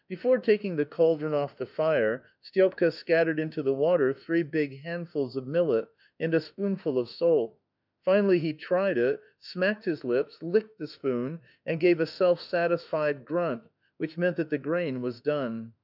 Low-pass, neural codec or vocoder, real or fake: 5.4 kHz; autoencoder, 48 kHz, 32 numbers a frame, DAC-VAE, trained on Japanese speech; fake